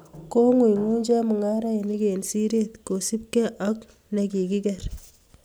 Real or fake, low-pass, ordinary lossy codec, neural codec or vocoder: real; none; none; none